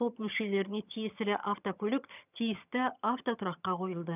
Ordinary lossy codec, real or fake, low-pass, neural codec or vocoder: none; fake; 3.6 kHz; vocoder, 22.05 kHz, 80 mel bands, HiFi-GAN